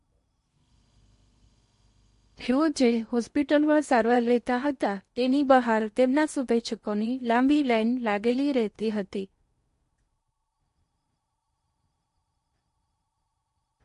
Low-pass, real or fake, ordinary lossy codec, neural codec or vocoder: 10.8 kHz; fake; MP3, 48 kbps; codec, 16 kHz in and 24 kHz out, 0.8 kbps, FocalCodec, streaming, 65536 codes